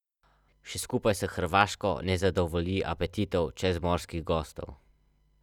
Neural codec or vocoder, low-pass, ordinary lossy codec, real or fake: none; 19.8 kHz; none; real